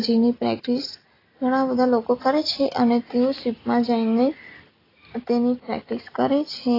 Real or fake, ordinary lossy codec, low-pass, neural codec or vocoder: real; AAC, 24 kbps; 5.4 kHz; none